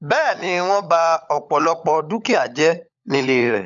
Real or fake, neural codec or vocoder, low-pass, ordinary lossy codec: fake; codec, 16 kHz, 16 kbps, FunCodec, trained on LibriTTS, 50 frames a second; 7.2 kHz; none